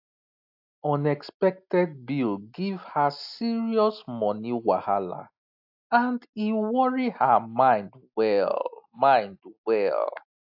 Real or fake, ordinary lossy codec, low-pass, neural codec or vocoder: real; AAC, 48 kbps; 5.4 kHz; none